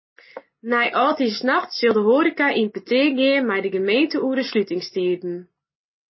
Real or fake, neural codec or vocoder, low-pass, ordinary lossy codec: real; none; 7.2 kHz; MP3, 24 kbps